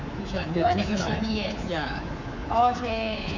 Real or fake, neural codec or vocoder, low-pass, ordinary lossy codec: fake; codec, 24 kHz, 3.1 kbps, DualCodec; 7.2 kHz; none